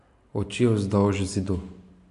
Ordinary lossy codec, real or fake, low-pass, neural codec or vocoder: AAC, 64 kbps; real; 10.8 kHz; none